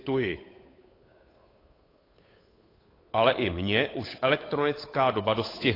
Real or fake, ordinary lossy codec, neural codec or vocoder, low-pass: fake; AAC, 24 kbps; codec, 16 kHz, 8 kbps, FunCodec, trained on Chinese and English, 25 frames a second; 5.4 kHz